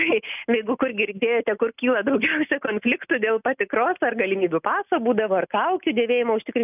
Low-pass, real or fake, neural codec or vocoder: 3.6 kHz; real; none